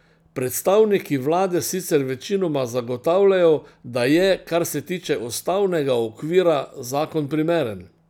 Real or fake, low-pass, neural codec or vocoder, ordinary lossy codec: real; 19.8 kHz; none; none